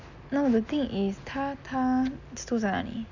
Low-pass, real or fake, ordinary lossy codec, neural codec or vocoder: 7.2 kHz; real; none; none